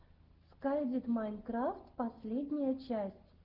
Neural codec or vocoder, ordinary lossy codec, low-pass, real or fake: none; Opus, 16 kbps; 5.4 kHz; real